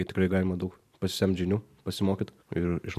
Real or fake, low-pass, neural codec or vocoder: fake; 14.4 kHz; vocoder, 44.1 kHz, 128 mel bands every 512 samples, BigVGAN v2